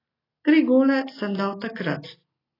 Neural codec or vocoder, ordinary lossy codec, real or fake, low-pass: none; AAC, 24 kbps; real; 5.4 kHz